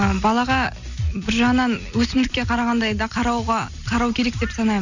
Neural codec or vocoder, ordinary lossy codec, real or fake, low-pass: none; none; real; 7.2 kHz